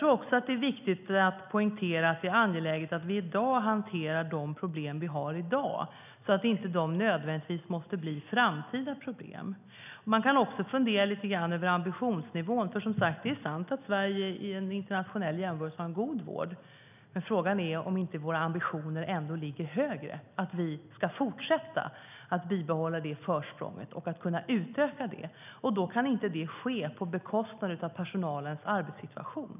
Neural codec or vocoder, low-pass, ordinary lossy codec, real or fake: none; 3.6 kHz; none; real